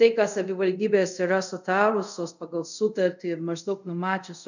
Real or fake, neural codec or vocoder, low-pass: fake; codec, 24 kHz, 0.5 kbps, DualCodec; 7.2 kHz